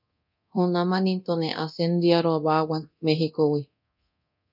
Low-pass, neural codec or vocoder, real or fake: 5.4 kHz; codec, 24 kHz, 0.9 kbps, DualCodec; fake